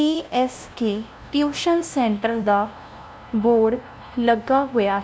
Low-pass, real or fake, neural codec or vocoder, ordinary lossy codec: none; fake; codec, 16 kHz, 0.5 kbps, FunCodec, trained on LibriTTS, 25 frames a second; none